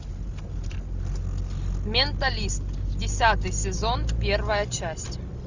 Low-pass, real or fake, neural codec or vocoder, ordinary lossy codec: 7.2 kHz; real; none; Opus, 64 kbps